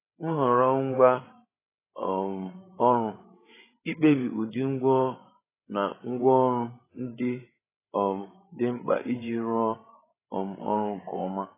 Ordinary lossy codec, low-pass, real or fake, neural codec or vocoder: AAC, 16 kbps; 3.6 kHz; fake; codec, 16 kHz, 8 kbps, FreqCodec, larger model